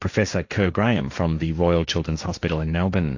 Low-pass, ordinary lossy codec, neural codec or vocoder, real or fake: 7.2 kHz; AAC, 48 kbps; codec, 16 kHz, 1.1 kbps, Voila-Tokenizer; fake